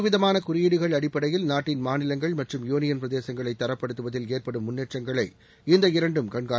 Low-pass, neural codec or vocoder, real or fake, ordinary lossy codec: none; none; real; none